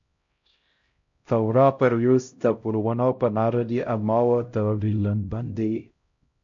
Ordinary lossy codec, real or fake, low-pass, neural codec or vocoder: MP3, 48 kbps; fake; 7.2 kHz; codec, 16 kHz, 0.5 kbps, X-Codec, HuBERT features, trained on LibriSpeech